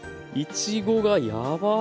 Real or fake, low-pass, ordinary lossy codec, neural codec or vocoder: real; none; none; none